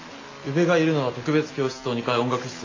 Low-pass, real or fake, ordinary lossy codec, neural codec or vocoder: 7.2 kHz; real; AAC, 32 kbps; none